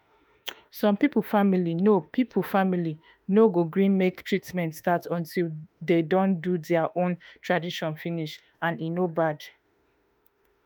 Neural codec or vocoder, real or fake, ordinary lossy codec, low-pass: autoencoder, 48 kHz, 32 numbers a frame, DAC-VAE, trained on Japanese speech; fake; none; none